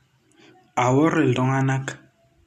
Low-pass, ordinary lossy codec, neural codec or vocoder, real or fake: 14.4 kHz; none; none; real